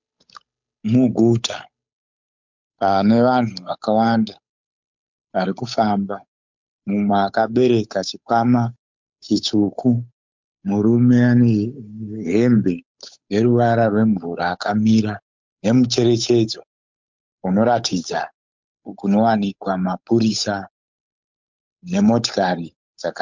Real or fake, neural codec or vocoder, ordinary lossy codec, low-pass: fake; codec, 16 kHz, 8 kbps, FunCodec, trained on Chinese and English, 25 frames a second; MP3, 64 kbps; 7.2 kHz